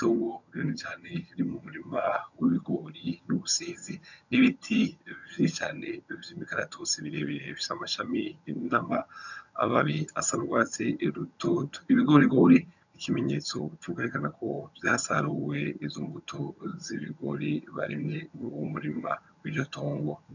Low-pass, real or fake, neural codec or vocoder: 7.2 kHz; fake; vocoder, 22.05 kHz, 80 mel bands, HiFi-GAN